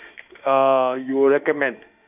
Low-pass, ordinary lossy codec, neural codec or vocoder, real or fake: 3.6 kHz; none; autoencoder, 48 kHz, 32 numbers a frame, DAC-VAE, trained on Japanese speech; fake